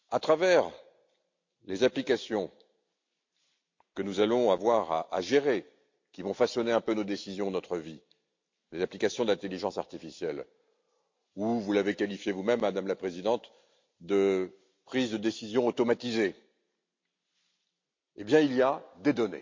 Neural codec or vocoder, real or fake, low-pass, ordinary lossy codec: none; real; 7.2 kHz; MP3, 48 kbps